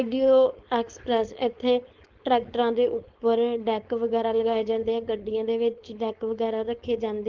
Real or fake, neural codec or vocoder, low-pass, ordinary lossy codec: fake; codec, 16 kHz, 4.8 kbps, FACodec; 7.2 kHz; Opus, 16 kbps